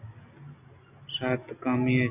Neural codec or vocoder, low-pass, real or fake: none; 3.6 kHz; real